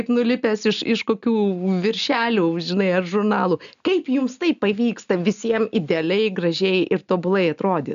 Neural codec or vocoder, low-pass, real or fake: none; 7.2 kHz; real